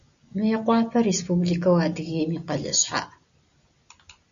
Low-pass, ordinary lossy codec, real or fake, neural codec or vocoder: 7.2 kHz; MP3, 96 kbps; real; none